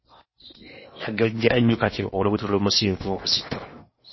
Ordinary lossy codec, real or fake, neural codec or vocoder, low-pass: MP3, 24 kbps; fake; codec, 16 kHz in and 24 kHz out, 0.8 kbps, FocalCodec, streaming, 65536 codes; 7.2 kHz